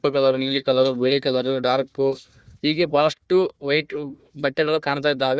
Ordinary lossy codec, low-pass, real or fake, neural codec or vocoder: none; none; fake; codec, 16 kHz, 1 kbps, FunCodec, trained on Chinese and English, 50 frames a second